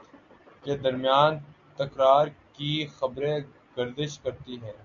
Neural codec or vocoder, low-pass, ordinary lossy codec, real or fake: none; 7.2 kHz; AAC, 64 kbps; real